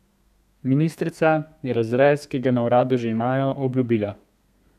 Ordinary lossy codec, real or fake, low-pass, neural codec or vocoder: none; fake; 14.4 kHz; codec, 32 kHz, 1.9 kbps, SNAC